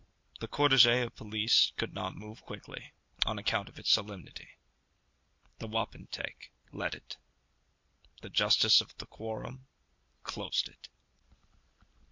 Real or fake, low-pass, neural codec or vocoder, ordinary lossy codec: real; 7.2 kHz; none; MP3, 48 kbps